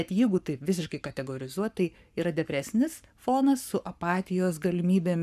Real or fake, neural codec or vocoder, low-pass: fake; codec, 44.1 kHz, 7.8 kbps, Pupu-Codec; 14.4 kHz